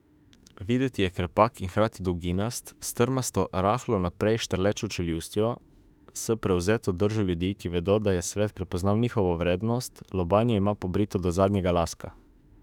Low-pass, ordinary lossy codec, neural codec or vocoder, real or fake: 19.8 kHz; none; autoencoder, 48 kHz, 32 numbers a frame, DAC-VAE, trained on Japanese speech; fake